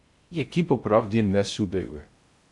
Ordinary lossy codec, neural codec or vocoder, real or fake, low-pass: MP3, 64 kbps; codec, 16 kHz in and 24 kHz out, 0.6 kbps, FocalCodec, streaming, 2048 codes; fake; 10.8 kHz